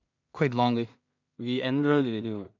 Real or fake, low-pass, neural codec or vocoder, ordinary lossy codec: fake; 7.2 kHz; codec, 16 kHz in and 24 kHz out, 0.4 kbps, LongCat-Audio-Codec, two codebook decoder; none